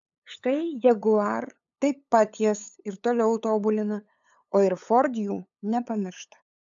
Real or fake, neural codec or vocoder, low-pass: fake; codec, 16 kHz, 8 kbps, FunCodec, trained on LibriTTS, 25 frames a second; 7.2 kHz